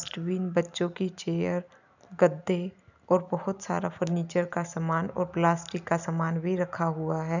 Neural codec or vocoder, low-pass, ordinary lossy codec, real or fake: none; 7.2 kHz; none; real